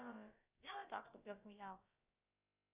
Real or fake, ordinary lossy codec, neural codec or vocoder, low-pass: fake; Opus, 64 kbps; codec, 16 kHz, about 1 kbps, DyCAST, with the encoder's durations; 3.6 kHz